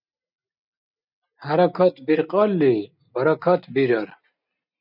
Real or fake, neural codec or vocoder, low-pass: real; none; 5.4 kHz